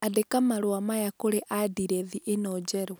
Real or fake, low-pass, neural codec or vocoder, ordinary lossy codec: real; none; none; none